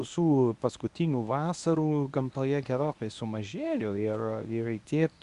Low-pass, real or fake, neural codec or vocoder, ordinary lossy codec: 10.8 kHz; fake; codec, 24 kHz, 0.9 kbps, WavTokenizer, medium speech release version 1; Opus, 64 kbps